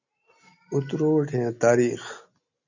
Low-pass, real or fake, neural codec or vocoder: 7.2 kHz; real; none